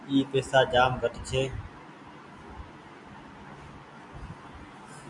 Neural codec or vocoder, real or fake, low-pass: none; real; 10.8 kHz